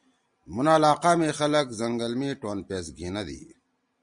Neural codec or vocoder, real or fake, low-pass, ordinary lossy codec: none; real; 9.9 kHz; Opus, 64 kbps